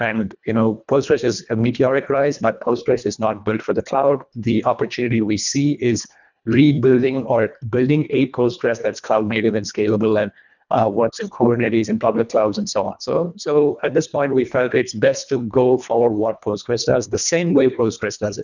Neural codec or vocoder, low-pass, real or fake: codec, 24 kHz, 1.5 kbps, HILCodec; 7.2 kHz; fake